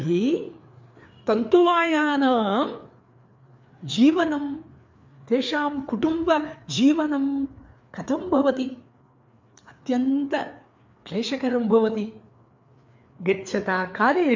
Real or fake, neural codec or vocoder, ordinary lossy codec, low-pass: fake; codec, 16 kHz, 4 kbps, FreqCodec, larger model; MP3, 64 kbps; 7.2 kHz